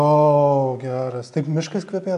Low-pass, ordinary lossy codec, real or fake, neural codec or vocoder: 10.8 kHz; AAC, 64 kbps; real; none